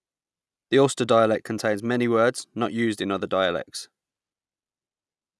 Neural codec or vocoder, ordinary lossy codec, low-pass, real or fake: none; none; none; real